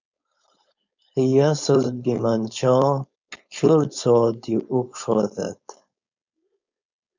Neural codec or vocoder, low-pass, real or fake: codec, 16 kHz, 4.8 kbps, FACodec; 7.2 kHz; fake